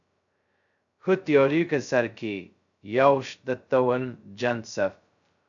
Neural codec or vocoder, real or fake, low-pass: codec, 16 kHz, 0.2 kbps, FocalCodec; fake; 7.2 kHz